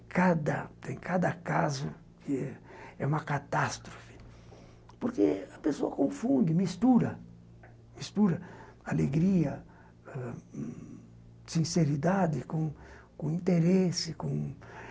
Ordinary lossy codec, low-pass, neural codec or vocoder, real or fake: none; none; none; real